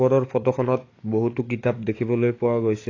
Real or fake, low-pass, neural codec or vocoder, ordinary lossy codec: fake; 7.2 kHz; codec, 44.1 kHz, 7.8 kbps, DAC; AAC, 32 kbps